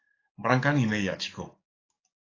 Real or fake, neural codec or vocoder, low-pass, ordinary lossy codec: fake; codec, 44.1 kHz, 7.8 kbps, DAC; 7.2 kHz; AAC, 32 kbps